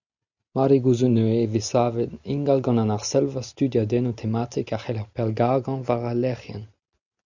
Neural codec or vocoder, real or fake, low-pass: none; real; 7.2 kHz